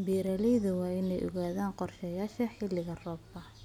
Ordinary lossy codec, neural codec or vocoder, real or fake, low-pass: none; none; real; 19.8 kHz